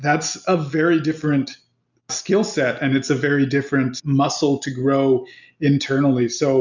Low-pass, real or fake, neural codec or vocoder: 7.2 kHz; real; none